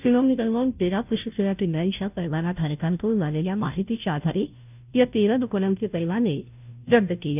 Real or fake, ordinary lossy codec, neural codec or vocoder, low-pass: fake; none; codec, 16 kHz, 0.5 kbps, FunCodec, trained on Chinese and English, 25 frames a second; 3.6 kHz